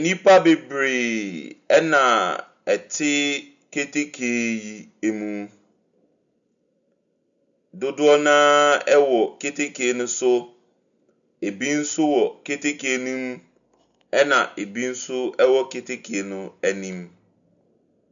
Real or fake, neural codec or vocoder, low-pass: real; none; 7.2 kHz